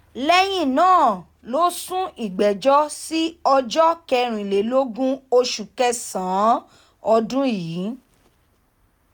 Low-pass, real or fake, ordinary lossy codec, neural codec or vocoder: none; real; none; none